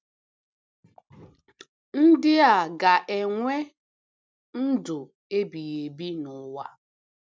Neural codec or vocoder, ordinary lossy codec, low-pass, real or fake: none; none; none; real